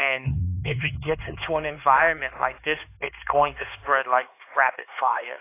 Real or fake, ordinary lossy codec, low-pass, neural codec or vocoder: fake; AAC, 24 kbps; 3.6 kHz; codec, 16 kHz, 4 kbps, X-Codec, HuBERT features, trained on LibriSpeech